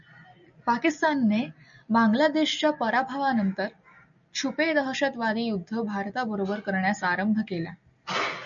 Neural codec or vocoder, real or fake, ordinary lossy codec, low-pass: none; real; MP3, 96 kbps; 7.2 kHz